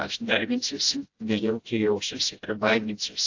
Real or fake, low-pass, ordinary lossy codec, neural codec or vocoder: fake; 7.2 kHz; AAC, 48 kbps; codec, 16 kHz, 0.5 kbps, FreqCodec, smaller model